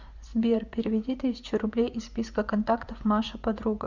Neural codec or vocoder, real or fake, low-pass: vocoder, 24 kHz, 100 mel bands, Vocos; fake; 7.2 kHz